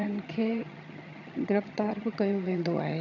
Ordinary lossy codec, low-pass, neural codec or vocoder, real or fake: none; 7.2 kHz; vocoder, 22.05 kHz, 80 mel bands, HiFi-GAN; fake